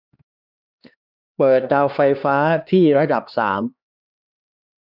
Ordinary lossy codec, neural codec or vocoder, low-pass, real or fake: none; codec, 16 kHz, 2 kbps, X-Codec, HuBERT features, trained on LibriSpeech; 5.4 kHz; fake